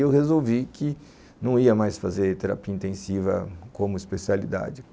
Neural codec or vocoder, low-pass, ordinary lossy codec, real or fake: none; none; none; real